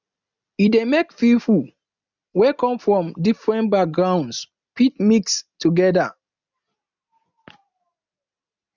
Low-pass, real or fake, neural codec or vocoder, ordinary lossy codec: 7.2 kHz; real; none; none